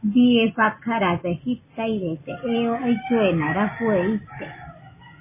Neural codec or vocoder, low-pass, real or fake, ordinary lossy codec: none; 3.6 kHz; real; MP3, 16 kbps